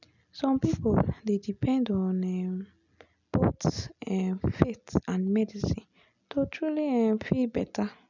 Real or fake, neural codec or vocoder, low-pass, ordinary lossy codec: real; none; 7.2 kHz; none